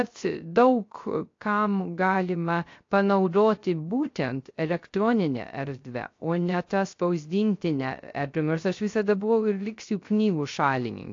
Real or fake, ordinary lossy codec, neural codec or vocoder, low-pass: fake; AAC, 48 kbps; codec, 16 kHz, 0.3 kbps, FocalCodec; 7.2 kHz